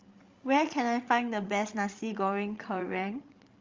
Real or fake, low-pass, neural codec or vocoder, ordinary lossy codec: fake; 7.2 kHz; codec, 16 kHz, 16 kbps, FreqCodec, larger model; Opus, 32 kbps